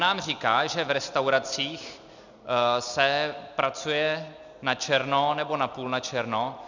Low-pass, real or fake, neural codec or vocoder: 7.2 kHz; real; none